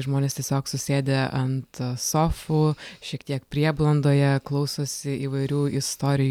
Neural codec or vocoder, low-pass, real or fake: none; 19.8 kHz; real